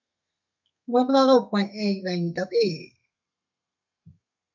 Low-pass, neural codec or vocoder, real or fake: 7.2 kHz; codec, 32 kHz, 1.9 kbps, SNAC; fake